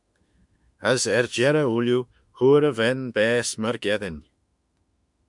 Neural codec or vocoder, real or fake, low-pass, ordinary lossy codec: autoencoder, 48 kHz, 32 numbers a frame, DAC-VAE, trained on Japanese speech; fake; 10.8 kHz; MP3, 96 kbps